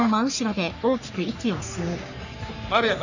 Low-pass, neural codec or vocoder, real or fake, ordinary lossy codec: 7.2 kHz; codec, 44.1 kHz, 3.4 kbps, Pupu-Codec; fake; none